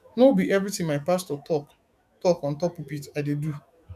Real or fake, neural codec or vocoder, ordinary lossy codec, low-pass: fake; autoencoder, 48 kHz, 128 numbers a frame, DAC-VAE, trained on Japanese speech; none; 14.4 kHz